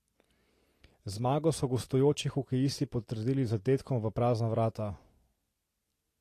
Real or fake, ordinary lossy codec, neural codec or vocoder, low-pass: real; AAC, 48 kbps; none; 14.4 kHz